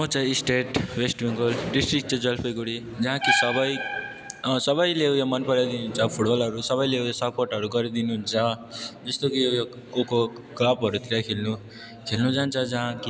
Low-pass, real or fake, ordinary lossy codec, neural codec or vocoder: none; real; none; none